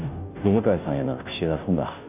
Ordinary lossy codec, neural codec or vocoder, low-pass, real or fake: none; codec, 16 kHz, 0.5 kbps, FunCodec, trained on Chinese and English, 25 frames a second; 3.6 kHz; fake